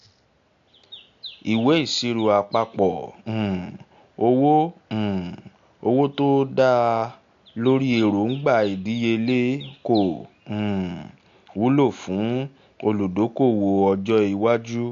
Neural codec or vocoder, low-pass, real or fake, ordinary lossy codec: none; 7.2 kHz; real; AAC, 64 kbps